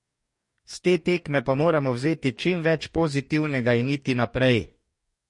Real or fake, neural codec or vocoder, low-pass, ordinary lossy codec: fake; codec, 44.1 kHz, 2.6 kbps, DAC; 10.8 kHz; MP3, 48 kbps